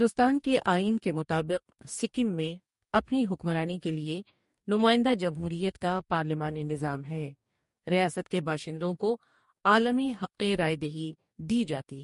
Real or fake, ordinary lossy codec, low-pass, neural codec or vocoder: fake; MP3, 48 kbps; 14.4 kHz; codec, 44.1 kHz, 2.6 kbps, DAC